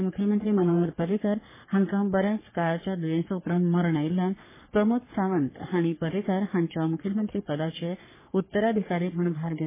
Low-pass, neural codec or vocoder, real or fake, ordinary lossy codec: 3.6 kHz; codec, 44.1 kHz, 3.4 kbps, Pupu-Codec; fake; MP3, 16 kbps